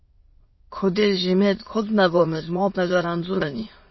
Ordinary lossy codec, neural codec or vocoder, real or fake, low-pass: MP3, 24 kbps; autoencoder, 22.05 kHz, a latent of 192 numbers a frame, VITS, trained on many speakers; fake; 7.2 kHz